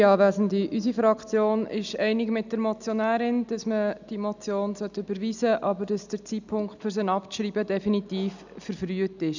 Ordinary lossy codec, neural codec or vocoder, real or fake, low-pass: none; none; real; 7.2 kHz